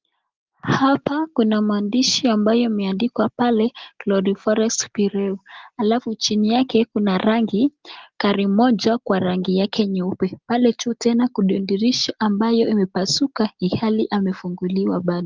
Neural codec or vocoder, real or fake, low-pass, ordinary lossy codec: none; real; 7.2 kHz; Opus, 24 kbps